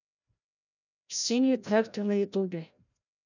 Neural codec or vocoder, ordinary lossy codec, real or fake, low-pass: codec, 16 kHz, 0.5 kbps, FreqCodec, larger model; none; fake; 7.2 kHz